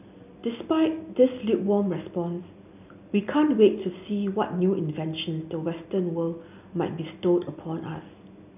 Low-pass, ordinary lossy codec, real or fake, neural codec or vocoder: 3.6 kHz; none; real; none